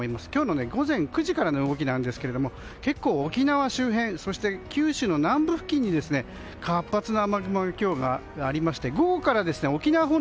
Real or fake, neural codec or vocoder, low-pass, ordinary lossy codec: real; none; none; none